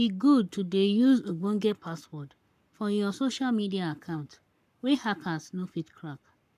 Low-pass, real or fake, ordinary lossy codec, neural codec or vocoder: 14.4 kHz; fake; none; codec, 44.1 kHz, 7.8 kbps, Pupu-Codec